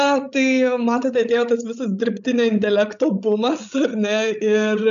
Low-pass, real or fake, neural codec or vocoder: 7.2 kHz; fake; codec, 16 kHz, 16 kbps, FreqCodec, larger model